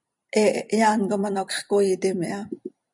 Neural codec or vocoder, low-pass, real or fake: vocoder, 44.1 kHz, 128 mel bands every 256 samples, BigVGAN v2; 10.8 kHz; fake